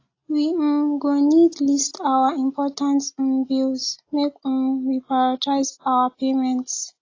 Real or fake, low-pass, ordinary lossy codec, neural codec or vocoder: real; 7.2 kHz; AAC, 32 kbps; none